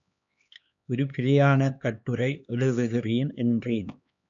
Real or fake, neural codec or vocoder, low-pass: fake; codec, 16 kHz, 2 kbps, X-Codec, HuBERT features, trained on LibriSpeech; 7.2 kHz